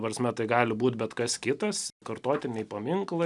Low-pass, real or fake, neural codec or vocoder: 10.8 kHz; real; none